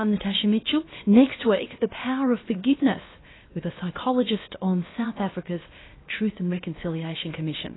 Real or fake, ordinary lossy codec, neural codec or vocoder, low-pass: fake; AAC, 16 kbps; codec, 16 kHz, about 1 kbps, DyCAST, with the encoder's durations; 7.2 kHz